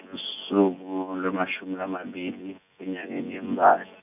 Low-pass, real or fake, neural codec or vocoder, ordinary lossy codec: 3.6 kHz; fake; vocoder, 24 kHz, 100 mel bands, Vocos; none